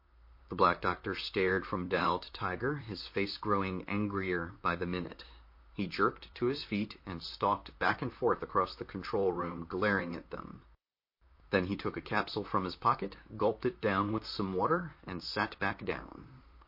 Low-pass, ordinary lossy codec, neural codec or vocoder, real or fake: 5.4 kHz; MP3, 32 kbps; vocoder, 44.1 kHz, 128 mel bands, Pupu-Vocoder; fake